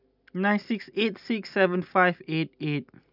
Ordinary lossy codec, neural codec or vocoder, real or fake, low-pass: none; none; real; 5.4 kHz